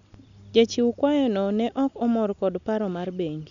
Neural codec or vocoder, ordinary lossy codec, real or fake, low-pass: none; none; real; 7.2 kHz